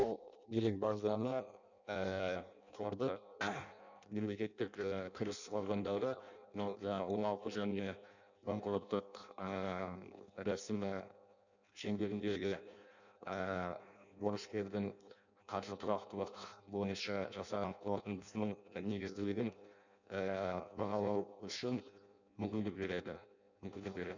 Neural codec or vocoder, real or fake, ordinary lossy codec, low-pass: codec, 16 kHz in and 24 kHz out, 0.6 kbps, FireRedTTS-2 codec; fake; none; 7.2 kHz